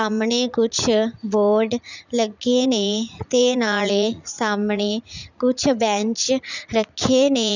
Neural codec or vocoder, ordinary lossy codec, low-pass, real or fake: vocoder, 44.1 kHz, 80 mel bands, Vocos; none; 7.2 kHz; fake